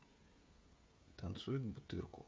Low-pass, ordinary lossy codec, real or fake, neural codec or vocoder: none; none; fake; codec, 16 kHz, 16 kbps, FreqCodec, smaller model